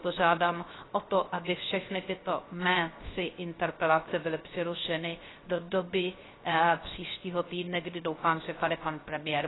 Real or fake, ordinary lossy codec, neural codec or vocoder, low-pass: fake; AAC, 16 kbps; codec, 16 kHz, 0.3 kbps, FocalCodec; 7.2 kHz